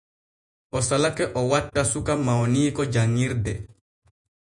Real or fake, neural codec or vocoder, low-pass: fake; vocoder, 48 kHz, 128 mel bands, Vocos; 10.8 kHz